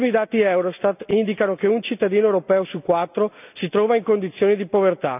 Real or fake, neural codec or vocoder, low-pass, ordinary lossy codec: real; none; 3.6 kHz; none